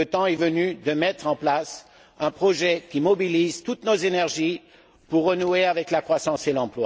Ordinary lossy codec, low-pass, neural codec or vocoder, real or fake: none; none; none; real